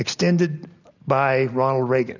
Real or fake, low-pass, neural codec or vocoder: real; 7.2 kHz; none